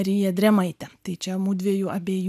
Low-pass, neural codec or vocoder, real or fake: 14.4 kHz; none; real